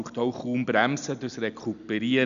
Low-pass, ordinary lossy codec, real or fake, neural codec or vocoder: 7.2 kHz; none; real; none